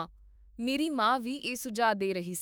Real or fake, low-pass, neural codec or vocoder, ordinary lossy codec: fake; none; autoencoder, 48 kHz, 32 numbers a frame, DAC-VAE, trained on Japanese speech; none